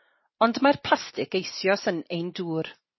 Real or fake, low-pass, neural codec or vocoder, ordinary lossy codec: real; 7.2 kHz; none; MP3, 24 kbps